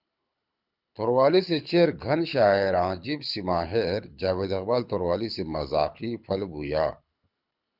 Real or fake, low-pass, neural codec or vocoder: fake; 5.4 kHz; codec, 24 kHz, 6 kbps, HILCodec